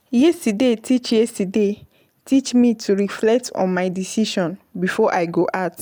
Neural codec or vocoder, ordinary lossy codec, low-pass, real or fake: none; none; none; real